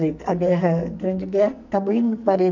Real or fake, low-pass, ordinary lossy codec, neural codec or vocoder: fake; 7.2 kHz; none; codec, 44.1 kHz, 2.6 kbps, SNAC